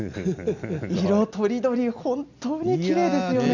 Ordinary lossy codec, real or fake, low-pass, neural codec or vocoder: none; real; 7.2 kHz; none